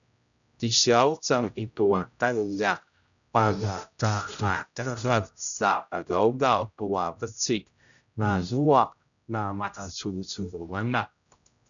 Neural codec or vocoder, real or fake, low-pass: codec, 16 kHz, 0.5 kbps, X-Codec, HuBERT features, trained on general audio; fake; 7.2 kHz